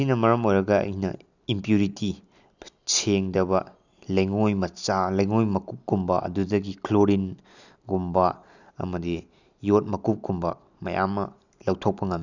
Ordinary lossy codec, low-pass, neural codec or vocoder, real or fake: none; 7.2 kHz; none; real